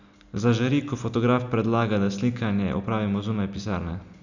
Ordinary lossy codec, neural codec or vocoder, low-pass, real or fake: none; none; 7.2 kHz; real